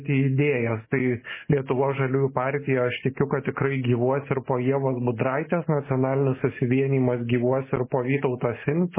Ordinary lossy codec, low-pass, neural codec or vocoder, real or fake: MP3, 16 kbps; 3.6 kHz; none; real